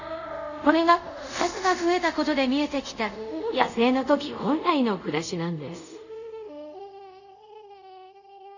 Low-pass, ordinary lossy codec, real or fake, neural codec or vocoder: 7.2 kHz; none; fake; codec, 24 kHz, 0.5 kbps, DualCodec